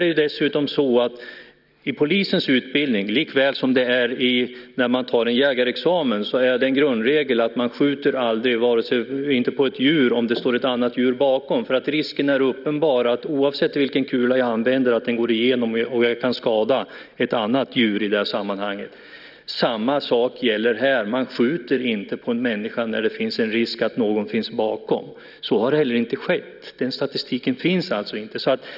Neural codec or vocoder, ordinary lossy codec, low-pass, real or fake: none; none; 5.4 kHz; real